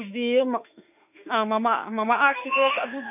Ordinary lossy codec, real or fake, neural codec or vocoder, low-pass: none; fake; autoencoder, 48 kHz, 32 numbers a frame, DAC-VAE, trained on Japanese speech; 3.6 kHz